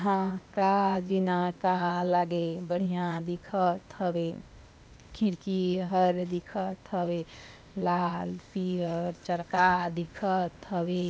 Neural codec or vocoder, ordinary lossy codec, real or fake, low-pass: codec, 16 kHz, 0.8 kbps, ZipCodec; none; fake; none